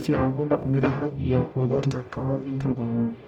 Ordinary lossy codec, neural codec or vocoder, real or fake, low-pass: none; codec, 44.1 kHz, 0.9 kbps, DAC; fake; 19.8 kHz